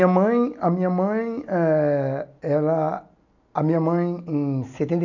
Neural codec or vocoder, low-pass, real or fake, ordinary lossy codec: none; 7.2 kHz; real; none